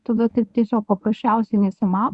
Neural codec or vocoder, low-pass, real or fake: codec, 24 kHz, 0.9 kbps, WavTokenizer, medium speech release version 1; 10.8 kHz; fake